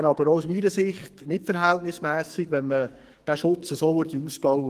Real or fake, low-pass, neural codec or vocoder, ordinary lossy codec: fake; 14.4 kHz; codec, 44.1 kHz, 2.6 kbps, SNAC; Opus, 24 kbps